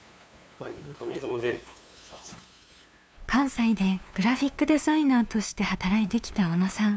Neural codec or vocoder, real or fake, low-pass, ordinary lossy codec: codec, 16 kHz, 2 kbps, FunCodec, trained on LibriTTS, 25 frames a second; fake; none; none